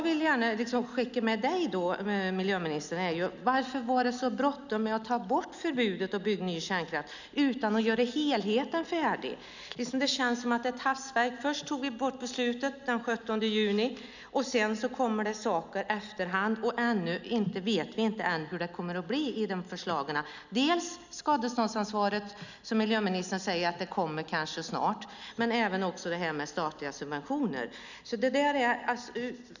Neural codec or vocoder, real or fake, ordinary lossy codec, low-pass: none; real; none; 7.2 kHz